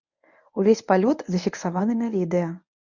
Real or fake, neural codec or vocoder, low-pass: fake; codec, 24 kHz, 0.9 kbps, WavTokenizer, medium speech release version 1; 7.2 kHz